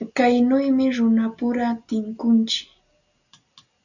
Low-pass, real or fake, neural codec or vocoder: 7.2 kHz; real; none